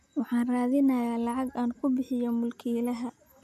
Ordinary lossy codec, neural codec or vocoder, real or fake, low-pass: none; none; real; 14.4 kHz